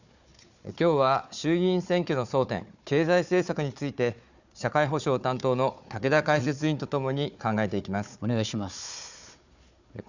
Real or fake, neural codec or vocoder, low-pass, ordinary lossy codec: fake; codec, 16 kHz, 4 kbps, FunCodec, trained on Chinese and English, 50 frames a second; 7.2 kHz; none